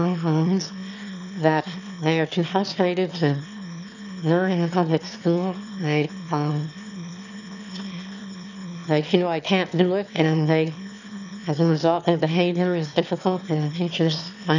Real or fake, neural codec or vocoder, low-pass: fake; autoencoder, 22.05 kHz, a latent of 192 numbers a frame, VITS, trained on one speaker; 7.2 kHz